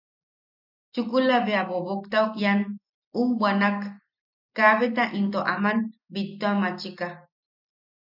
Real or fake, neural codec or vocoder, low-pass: real; none; 5.4 kHz